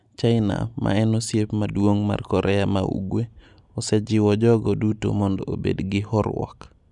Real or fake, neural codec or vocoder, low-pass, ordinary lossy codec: real; none; 10.8 kHz; none